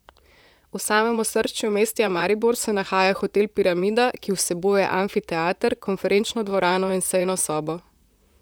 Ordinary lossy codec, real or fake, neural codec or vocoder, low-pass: none; fake; vocoder, 44.1 kHz, 128 mel bands, Pupu-Vocoder; none